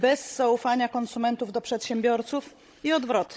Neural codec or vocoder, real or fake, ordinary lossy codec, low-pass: codec, 16 kHz, 16 kbps, FunCodec, trained on Chinese and English, 50 frames a second; fake; none; none